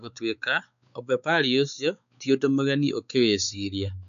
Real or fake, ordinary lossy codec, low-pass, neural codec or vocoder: fake; none; 7.2 kHz; codec, 16 kHz, 4 kbps, X-Codec, WavLM features, trained on Multilingual LibriSpeech